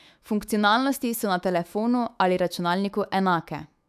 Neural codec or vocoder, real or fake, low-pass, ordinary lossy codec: autoencoder, 48 kHz, 128 numbers a frame, DAC-VAE, trained on Japanese speech; fake; 14.4 kHz; none